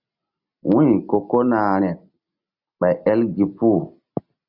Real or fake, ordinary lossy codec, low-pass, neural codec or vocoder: real; Opus, 64 kbps; 5.4 kHz; none